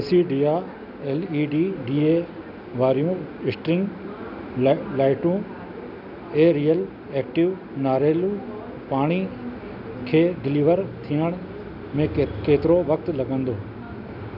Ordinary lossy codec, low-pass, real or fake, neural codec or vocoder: none; 5.4 kHz; real; none